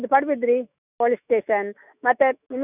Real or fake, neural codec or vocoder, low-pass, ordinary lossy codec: fake; autoencoder, 48 kHz, 128 numbers a frame, DAC-VAE, trained on Japanese speech; 3.6 kHz; none